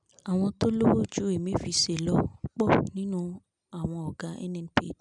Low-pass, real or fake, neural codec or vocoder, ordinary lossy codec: 10.8 kHz; real; none; none